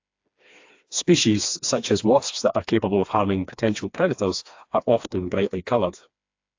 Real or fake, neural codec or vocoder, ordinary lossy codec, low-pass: fake; codec, 16 kHz, 2 kbps, FreqCodec, smaller model; AAC, 48 kbps; 7.2 kHz